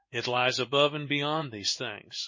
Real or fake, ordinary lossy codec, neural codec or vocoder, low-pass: fake; MP3, 32 kbps; codec, 16 kHz in and 24 kHz out, 1 kbps, XY-Tokenizer; 7.2 kHz